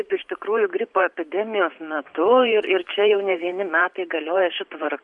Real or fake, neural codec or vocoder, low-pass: fake; vocoder, 44.1 kHz, 128 mel bands every 512 samples, BigVGAN v2; 10.8 kHz